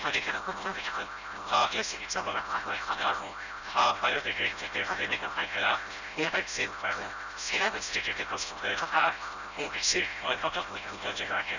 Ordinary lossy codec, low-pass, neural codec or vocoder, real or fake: none; 7.2 kHz; codec, 16 kHz, 0.5 kbps, FreqCodec, smaller model; fake